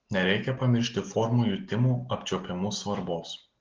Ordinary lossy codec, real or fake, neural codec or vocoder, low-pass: Opus, 16 kbps; real; none; 7.2 kHz